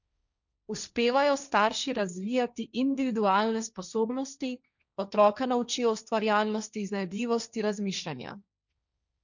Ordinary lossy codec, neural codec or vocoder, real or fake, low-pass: none; codec, 16 kHz, 1.1 kbps, Voila-Tokenizer; fake; 7.2 kHz